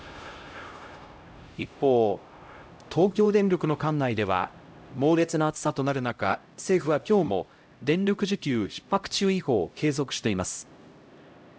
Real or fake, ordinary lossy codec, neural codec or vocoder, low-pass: fake; none; codec, 16 kHz, 0.5 kbps, X-Codec, HuBERT features, trained on LibriSpeech; none